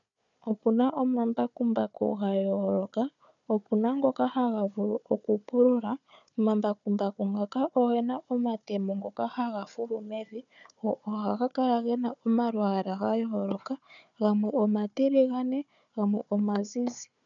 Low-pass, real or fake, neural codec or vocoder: 7.2 kHz; fake; codec, 16 kHz, 4 kbps, FunCodec, trained on Chinese and English, 50 frames a second